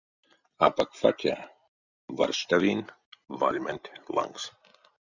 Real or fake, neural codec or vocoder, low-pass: real; none; 7.2 kHz